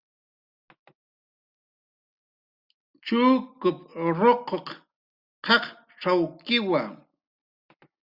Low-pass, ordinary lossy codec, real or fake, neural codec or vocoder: 5.4 kHz; Opus, 64 kbps; real; none